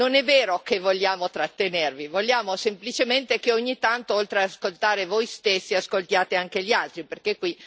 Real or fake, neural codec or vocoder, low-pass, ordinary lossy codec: real; none; none; none